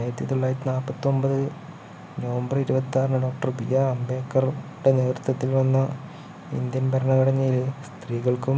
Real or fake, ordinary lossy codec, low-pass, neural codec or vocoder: real; none; none; none